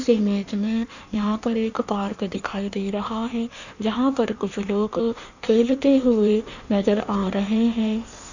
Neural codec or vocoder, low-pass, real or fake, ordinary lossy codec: codec, 16 kHz in and 24 kHz out, 1.1 kbps, FireRedTTS-2 codec; 7.2 kHz; fake; none